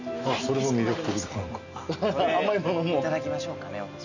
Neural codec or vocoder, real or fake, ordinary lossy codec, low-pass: none; real; none; 7.2 kHz